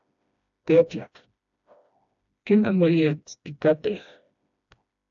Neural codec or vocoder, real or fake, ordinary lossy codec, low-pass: codec, 16 kHz, 1 kbps, FreqCodec, smaller model; fake; AAC, 64 kbps; 7.2 kHz